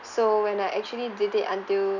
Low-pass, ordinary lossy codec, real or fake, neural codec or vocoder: 7.2 kHz; none; real; none